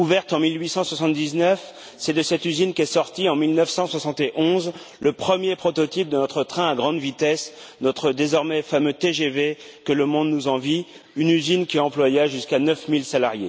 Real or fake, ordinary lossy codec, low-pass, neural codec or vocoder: real; none; none; none